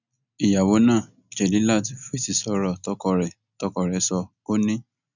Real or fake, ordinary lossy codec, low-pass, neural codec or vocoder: real; none; 7.2 kHz; none